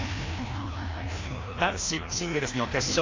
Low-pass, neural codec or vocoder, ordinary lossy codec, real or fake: 7.2 kHz; codec, 16 kHz, 1 kbps, FreqCodec, larger model; AAC, 32 kbps; fake